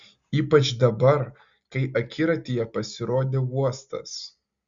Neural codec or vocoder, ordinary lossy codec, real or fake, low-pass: none; Opus, 64 kbps; real; 7.2 kHz